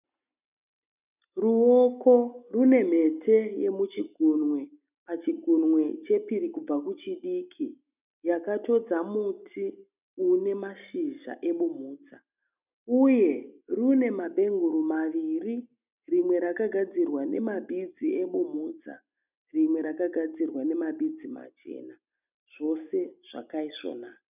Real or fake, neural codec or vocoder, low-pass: real; none; 3.6 kHz